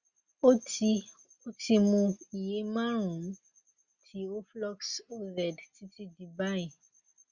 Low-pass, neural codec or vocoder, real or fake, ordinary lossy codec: 7.2 kHz; none; real; Opus, 64 kbps